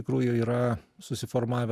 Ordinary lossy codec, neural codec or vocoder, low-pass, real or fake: Opus, 64 kbps; none; 14.4 kHz; real